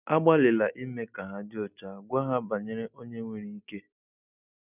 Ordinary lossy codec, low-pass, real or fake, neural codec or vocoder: none; 3.6 kHz; real; none